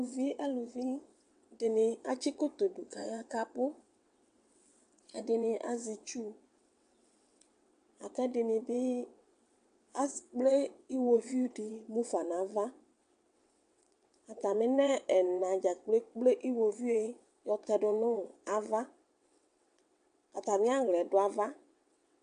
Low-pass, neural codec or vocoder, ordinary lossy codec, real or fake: 9.9 kHz; vocoder, 22.05 kHz, 80 mel bands, WaveNeXt; AAC, 64 kbps; fake